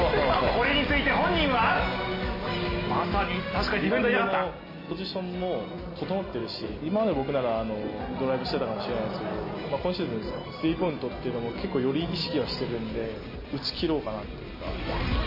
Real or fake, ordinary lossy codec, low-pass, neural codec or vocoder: real; MP3, 24 kbps; 5.4 kHz; none